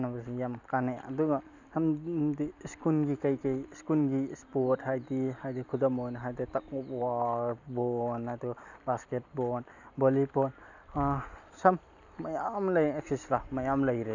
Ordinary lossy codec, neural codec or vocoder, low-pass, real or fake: none; none; 7.2 kHz; real